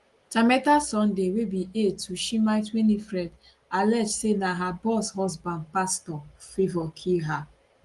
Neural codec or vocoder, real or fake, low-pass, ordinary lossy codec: none; real; 10.8 kHz; Opus, 24 kbps